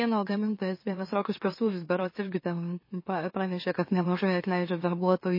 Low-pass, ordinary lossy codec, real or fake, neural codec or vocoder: 5.4 kHz; MP3, 24 kbps; fake; autoencoder, 44.1 kHz, a latent of 192 numbers a frame, MeloTTS